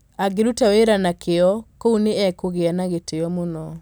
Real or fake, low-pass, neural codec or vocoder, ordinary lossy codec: real; none; none; none